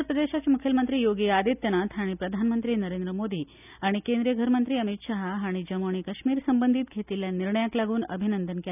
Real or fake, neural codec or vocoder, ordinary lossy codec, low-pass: real; none; none; 3.6 kHz